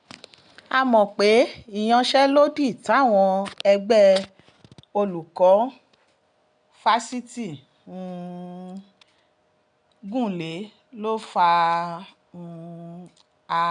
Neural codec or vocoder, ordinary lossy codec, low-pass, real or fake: none; none; 9.9 kHz; real